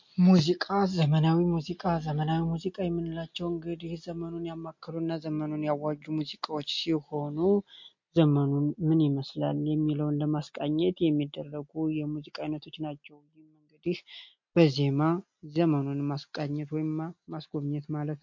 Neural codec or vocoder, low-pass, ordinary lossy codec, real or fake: none; 7.2 kHz; MP3, 48 kbps; real